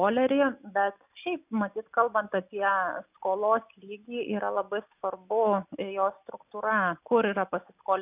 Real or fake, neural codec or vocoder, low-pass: real; none; 3.6 kHz